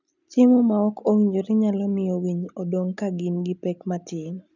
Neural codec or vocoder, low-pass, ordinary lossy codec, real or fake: none; 7.2 kHz; none; real